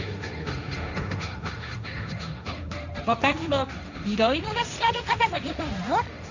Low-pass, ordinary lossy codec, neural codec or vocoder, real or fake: 7.2 kHz; none; codec, 16 kHz, 1.1 kbps, Voila-Tokenizer; fake